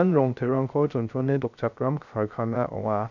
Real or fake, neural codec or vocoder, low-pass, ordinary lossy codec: fake; codec, 16 kHz, 0.3 kbps, FocalCodec; 7.2 kHz; none